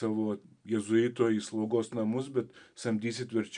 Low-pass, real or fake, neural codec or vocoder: 9.9 kHz; real; none